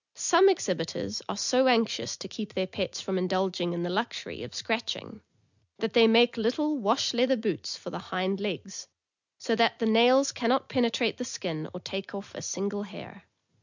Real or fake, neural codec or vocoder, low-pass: real; none; 7.2 kHz